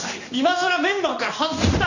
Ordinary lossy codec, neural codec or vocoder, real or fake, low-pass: none; codec, 16 kHz in and 24 kHz out, 1 kbps, XY-Tokenizer; fake; 7.2 kHz